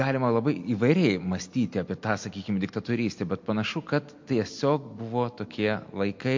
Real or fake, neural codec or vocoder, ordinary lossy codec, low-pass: real; none; MP3, 48 kbps; 7.2 kHz